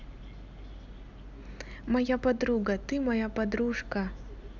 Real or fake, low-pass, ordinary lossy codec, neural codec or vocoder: real; 7.2 kHz; none; none